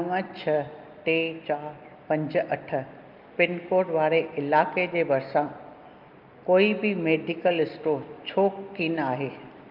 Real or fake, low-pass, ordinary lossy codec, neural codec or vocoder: real; 5.4 kHz; Opus, 24 kbps; none